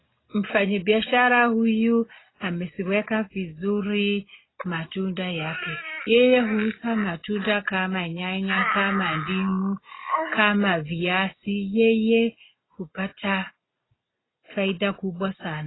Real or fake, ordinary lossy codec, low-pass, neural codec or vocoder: real; AAC, 16 kbps; 7.2 kHz; none